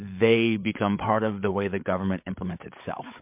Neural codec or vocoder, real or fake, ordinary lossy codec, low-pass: codec, 16 kHz, 8 kbps, FreqCodec, larger model; fake; MP3, 32 kbps; 3.6 kHz